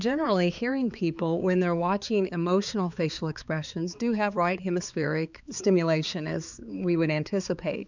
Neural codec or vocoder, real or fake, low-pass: codec, 16 kHz, 4 kbps, X-Codec, HuBERT features, trained on balanced general audio; fake; 7.2 kHz